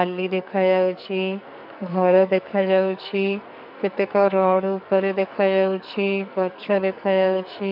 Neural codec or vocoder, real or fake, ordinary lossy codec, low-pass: codec, 44.1 kHz, 2.6 kbps, SNAC; fake; none; 5.4 kHz